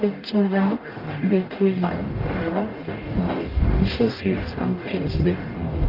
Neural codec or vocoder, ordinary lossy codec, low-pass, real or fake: codec, 44.1 kHz, 0.9 kbps, DAC; Opus, 24 kbps; 5.4 kHz; fake